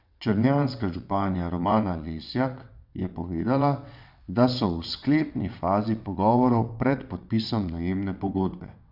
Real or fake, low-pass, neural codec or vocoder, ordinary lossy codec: fake; 5.4 kHz; vocoder, 22.05 kHz, 80 mel bands, WaveNeXt; none